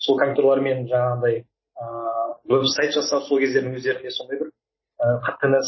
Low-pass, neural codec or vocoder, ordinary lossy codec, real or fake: 7.2 kHz; none; MP3, 24 kbps; real